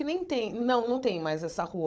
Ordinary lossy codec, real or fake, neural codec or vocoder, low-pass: none; fake; codec, 16 kHz, 16 kbps, FunCodec, trained on Chinese and English, 50 frames a second; none